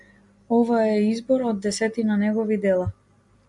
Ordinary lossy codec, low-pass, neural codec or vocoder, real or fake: MP3, 96 kbps; 10.8 kHz; none; real